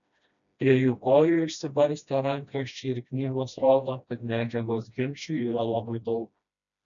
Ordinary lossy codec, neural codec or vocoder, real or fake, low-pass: MP3, 96 kbps; codec, 16 kHz, 1 kbps, FreqCodec, smaller model; fake; 7.2 kHz